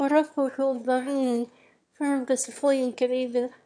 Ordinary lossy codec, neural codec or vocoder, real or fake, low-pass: none; autoencoder, 22.05 kHz, a latent of 192 numbers a frame, VITS, trained on one speaker; fake; 9.9 kHz